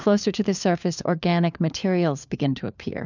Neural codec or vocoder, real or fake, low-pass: codec, 16 kHz, 4 kbps, FunCodec, trained on LibriTTS, 50 frames a second; fake; 7.2 kHz